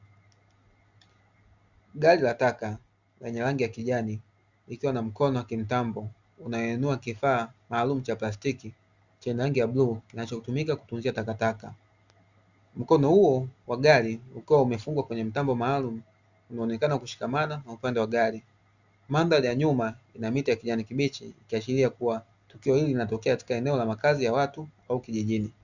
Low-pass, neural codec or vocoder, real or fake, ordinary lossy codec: 7.2 kHz; none; real; Opus, 64 kbps